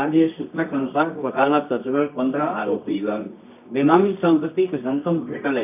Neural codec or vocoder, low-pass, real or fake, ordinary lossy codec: codec, 24 kHz, 0.9 kbps, WavTokenizer, medium music audio release; 3.6 kHz; fake; none